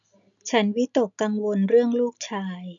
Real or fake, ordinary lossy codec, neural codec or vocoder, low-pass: real; none; none; 7.2 kHz